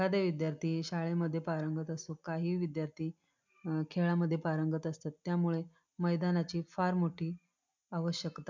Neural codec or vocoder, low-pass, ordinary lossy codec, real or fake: none; 7.2 kHz; MP3, 64 kbps; real